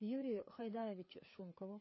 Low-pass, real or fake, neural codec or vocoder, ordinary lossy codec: 7.2 kHz; fake; codec, 16 kHz, 4 kbps, FreqCodec, larger model; MP3, 24 kbps